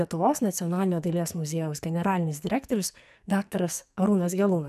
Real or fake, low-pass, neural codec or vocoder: fake; 14.4 kHz; codec, 44.1 kHz, 2.6 kbps, SNAC